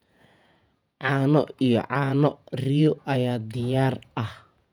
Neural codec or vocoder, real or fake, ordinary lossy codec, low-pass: vocoder, 48 kHz, 128 mel bands, Vocos; fake; none; 19.8 kHz